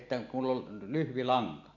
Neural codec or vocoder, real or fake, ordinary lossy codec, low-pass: none; real; none; 7.2 kHz